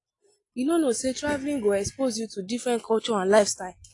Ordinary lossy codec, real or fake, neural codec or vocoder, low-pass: AAC, 48 kbps; real; none; 10.8 kHz